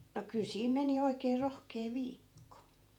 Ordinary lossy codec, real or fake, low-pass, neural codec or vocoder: none; fake; 19.8 kHz; vocoder, 48 kHz, 128 mel bands, Vocos